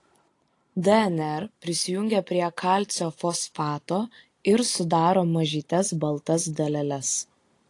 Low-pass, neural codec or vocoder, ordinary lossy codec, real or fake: 10.8 kHz; none; AAC, 48 kbps; real